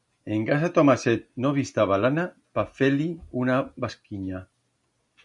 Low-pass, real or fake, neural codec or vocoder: 10.8 kHz; real; none